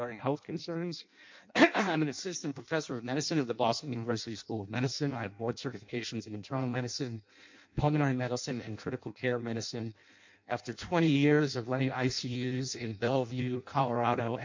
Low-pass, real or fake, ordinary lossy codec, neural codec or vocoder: 7.2 kHz; fake; MP3, 48 kbps; codec, 16 kHz in and 24 kHz out, 0.6 kbps, FireRedTTS-2 codec